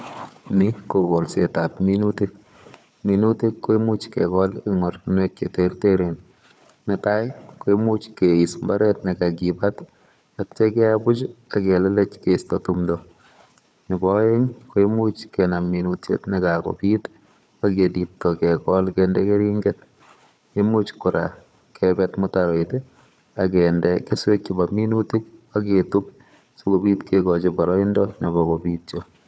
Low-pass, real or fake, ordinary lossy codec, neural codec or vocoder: none; fake; none; codec, 16 kHz, 4 kbps, FunCodec, trained on Chinese and English, 50 frames a second